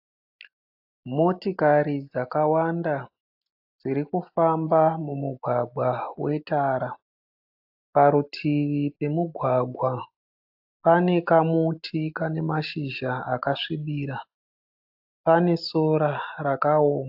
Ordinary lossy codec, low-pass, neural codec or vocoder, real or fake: AAC, 48 kbps; 5.4 kHz; none; real